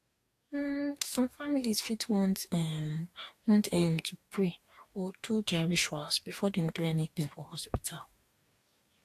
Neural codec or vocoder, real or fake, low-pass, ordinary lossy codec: codec, 44.1 kHz, 2.6 kbps, DAC; fake; 14.4 kHz; AAC, 64 kbps